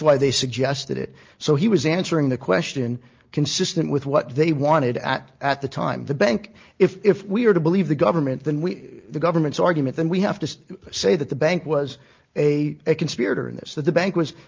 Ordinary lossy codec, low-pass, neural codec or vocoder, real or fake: Opus, 24 kbps; 7.2 kHz; none; real